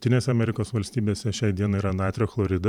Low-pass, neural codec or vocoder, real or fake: 19.8 kHz; vocoder, 44.1 kHz, 128 mel bands every 512 samples, BigVGAN v2; fake